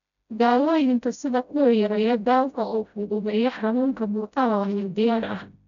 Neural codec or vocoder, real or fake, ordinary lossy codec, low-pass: codec, 16 kHz, 0.5 kbps, FreqCodec, smaller model; fake; MP3, 96 kbps; 7.2 kHz